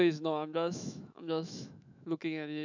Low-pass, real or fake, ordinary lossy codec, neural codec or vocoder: 7.2 kHz; fake; none; autoencoder, 48 kHz, 128 numbers a frame, DAC-VAE, trained on Japanese speech